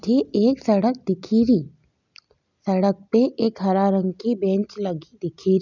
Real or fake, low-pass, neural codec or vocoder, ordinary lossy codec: real; 7.2 kHz; none; none